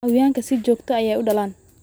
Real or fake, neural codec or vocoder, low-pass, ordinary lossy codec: real; none; none; none